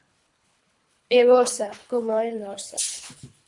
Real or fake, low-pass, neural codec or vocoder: fake; 10.8 kHz; codec, 24 kHz, 3 kbps, HILCodec